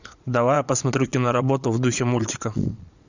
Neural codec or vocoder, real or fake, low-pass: vocoder, 22.05 kHz, 80 mel bands, Vocos; fake; 7.2 kHz